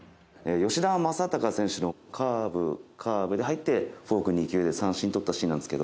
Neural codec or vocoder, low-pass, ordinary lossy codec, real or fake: none; none; none; real